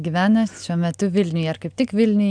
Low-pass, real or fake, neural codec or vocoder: 9.9 kHz; real; none